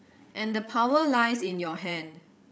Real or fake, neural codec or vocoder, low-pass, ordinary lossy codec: fake; codec, 16 kHz, 8 kbps, FreqCodec, larger model; none; none